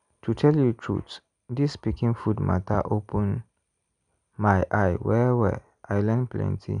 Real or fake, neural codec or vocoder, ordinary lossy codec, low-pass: real; none; none; 9.9 kHz